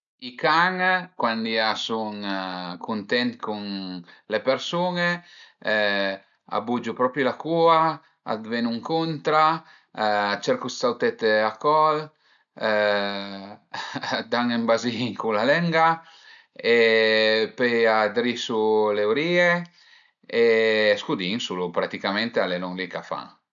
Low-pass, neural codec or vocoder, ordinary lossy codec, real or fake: 7.2 kHz; none; none; real